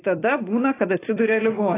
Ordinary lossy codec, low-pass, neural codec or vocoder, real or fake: AAC, 16 kbps; 3.6 kHz; vocoder, 44.1 kHz, 128 mel bands every 256 samples, BigVGAN v2; fake